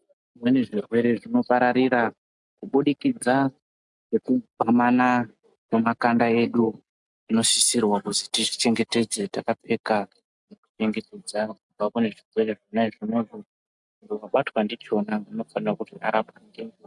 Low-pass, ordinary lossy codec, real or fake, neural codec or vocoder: 10.8 kHz; MP3, 96 kbps; real; none